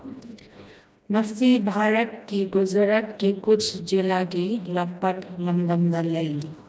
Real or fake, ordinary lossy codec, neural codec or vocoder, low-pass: fake; none; codec, 16 kHz, 1 kbps, FreqCodec, smaller model; none